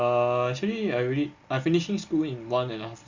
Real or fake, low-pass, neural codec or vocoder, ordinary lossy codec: real; 7.2 kHz; none; Opus, 64 kbps